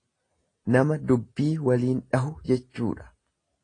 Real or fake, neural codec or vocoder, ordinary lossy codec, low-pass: real; none; AAC, 32 kbps; 9.9 kHz